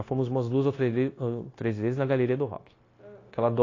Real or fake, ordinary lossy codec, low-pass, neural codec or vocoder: fake; AAC, 32 kbps; 7.2 kHz; codec, 16 kHz, 0.9 kbps, LongCat-Audio-Codec